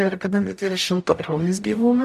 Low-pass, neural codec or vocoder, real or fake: 14.4 kHz; codec, 44.1 kHz, 0.9 kbps, DAC; fake